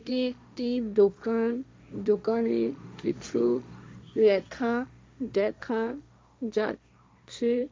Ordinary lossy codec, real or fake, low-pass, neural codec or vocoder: none; fake; none; codec, 16 kHz, 1.1 kbps, Voila-Tokenizer